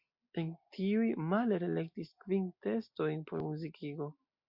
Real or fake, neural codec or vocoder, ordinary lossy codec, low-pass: real; none; MP3, 48 kbps; 5.4 kHz